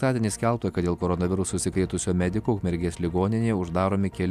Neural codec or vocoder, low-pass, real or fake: none; 14.4 kHz; real